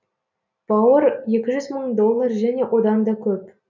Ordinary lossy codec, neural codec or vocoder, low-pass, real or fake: none; none; 7.2 kHz; real